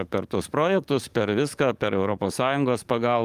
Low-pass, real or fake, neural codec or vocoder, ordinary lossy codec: 14.4 kHz; fake; codec, 44.1 kHz, 7.8 kbps, DAC; Opus, 32 kbps